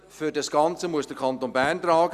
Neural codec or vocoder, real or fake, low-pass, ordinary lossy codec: none; real; 14.4 kHz; Opus, 64 kbps